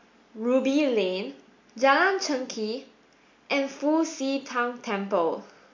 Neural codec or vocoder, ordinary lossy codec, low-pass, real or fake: none; MP3, 48 kbps; 7.2 kHz; real